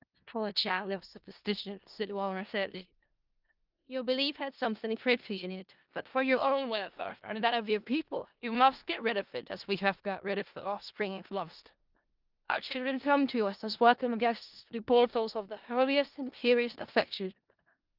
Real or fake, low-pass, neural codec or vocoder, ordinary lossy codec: fake; 5.4 kHz; codec, 16 kHz in and 24 kHz out, 0.4 kbps, LongCat-Audio-Codec, four codebook decoder; Opus, 24 kbps